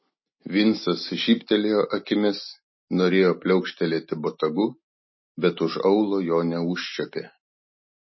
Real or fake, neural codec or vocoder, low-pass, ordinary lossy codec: real; none; 7.2 kHz; MP3, 24 kbps